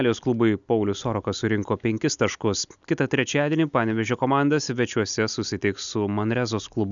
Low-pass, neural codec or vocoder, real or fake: 7.2 kHz; none; real